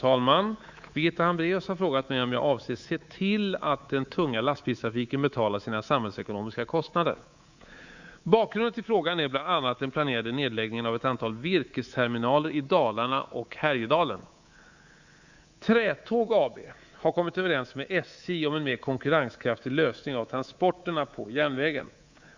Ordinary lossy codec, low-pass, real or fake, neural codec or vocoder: Opus, 64 kbps; 7.2 kHz; fake; codec, 24 kHz, 3.1 kbps, DualCodec